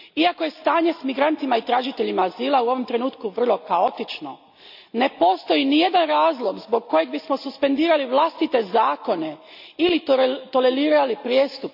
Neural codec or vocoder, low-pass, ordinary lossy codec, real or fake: none; 5.4 kHz; AAC, 48 kbps; real